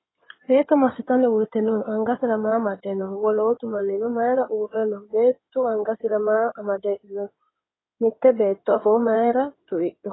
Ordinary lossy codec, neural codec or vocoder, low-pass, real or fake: AAC, 16 kbps; codec, 16 kHz in and 24 kHz out, 2.2 kbps, FireRedTTS-2 codec; 7.2 kHz; fake